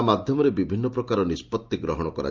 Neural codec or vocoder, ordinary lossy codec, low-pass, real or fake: none; Opus, 24 kbps; 7.2 kHz; real